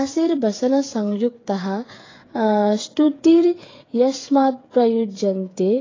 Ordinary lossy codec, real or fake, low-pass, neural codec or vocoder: AAC, 32 kbps; fake; 7.2 kHz; codec, 16 kHz, 8 kbps, FreqCodec, smaller model